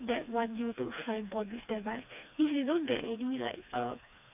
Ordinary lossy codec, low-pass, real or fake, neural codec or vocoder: AAC, 32 kbps; 3.6 kHz; fake; codec, 16 kHz, 2 kbps, FreqCodec, smaller model